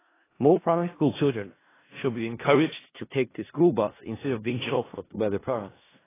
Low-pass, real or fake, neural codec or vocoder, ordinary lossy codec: 3.6 kHz; fake; codec, 16 kHz in and 24 kHz out, 0.4 kbps, LongCat-Audio-Codec, four codebook decoder; AAC, 16 kbps